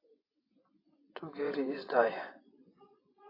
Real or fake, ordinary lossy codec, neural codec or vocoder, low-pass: fake; AAC, 24 kbps; vocoder, 24 kHz, 100 mel bands, Vocos; 5.4 kHz